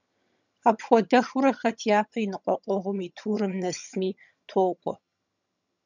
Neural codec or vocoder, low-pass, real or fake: vocoder, 22.05 kHz, 80 mel bands, HiFi-GAN; 7.2 kHz; fake